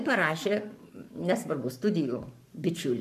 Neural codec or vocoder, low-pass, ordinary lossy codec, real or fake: codec, 44.1 kHz, 7.8 kbps, Pupu-Codec; 14.4 kHz; MP3, 96 kbps; fake